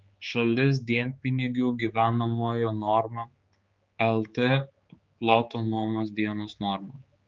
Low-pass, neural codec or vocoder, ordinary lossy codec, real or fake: 7.2 kHz; codec, 16 kHz, 4 kbps, X-Codec, HuBERT features, trained on general audio; Opus, 24 kbps; fake